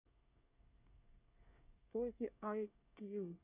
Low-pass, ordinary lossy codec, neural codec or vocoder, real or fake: 3.6 kHz; none; codec, 24 kHz, 1 kbps, SNAC; fake